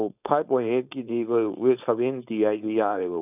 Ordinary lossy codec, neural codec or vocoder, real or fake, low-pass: none; codec, 16 kHz, 4.8 kbps, FACodec; fake; 3.6 kHz